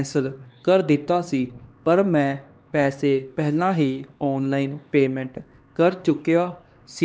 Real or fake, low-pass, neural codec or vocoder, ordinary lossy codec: fake; none; codec, 16 kHz, 2 kbps, X-Codec, HuBERT features, trained on LibriSpeech; none